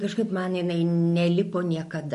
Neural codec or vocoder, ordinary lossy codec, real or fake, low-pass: none; MP3, 48 kbps; real; 14.4 kHz